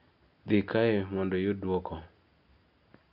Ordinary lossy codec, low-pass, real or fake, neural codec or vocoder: none; 5.4 kHz; real; none